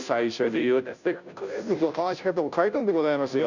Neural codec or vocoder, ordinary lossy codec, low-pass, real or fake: codec, 16 kHz, 0.5 kbps, FunCodec, trained on Chinese and English, 25 frames a second; none; 7.2 kHz; fake